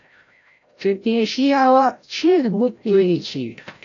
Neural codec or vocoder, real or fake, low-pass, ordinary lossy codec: codec, 16 kHz, 0.5 kbps, FreqCodec, larger model; fake; 7.2 kHz; AAC, 48 kbps